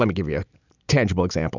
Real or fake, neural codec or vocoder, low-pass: real; none; 7.2 kHz